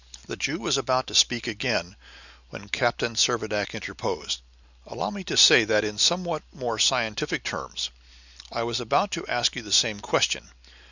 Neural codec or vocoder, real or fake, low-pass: none; real; 7.2 kHz